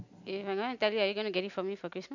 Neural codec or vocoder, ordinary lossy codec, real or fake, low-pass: none; none; real; 7.2 kHz